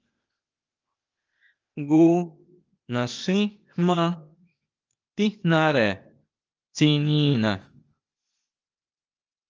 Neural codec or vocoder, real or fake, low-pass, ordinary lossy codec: codec, 16 kHz, 0.8 kbps, ZipCodec; fake; 7.2 kHz; Opus, 24 kbps